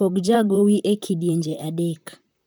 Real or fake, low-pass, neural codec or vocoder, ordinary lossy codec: fake; none; vocoder, 44.1 kHz, 128 mel bands, Pupu-Vocoder; none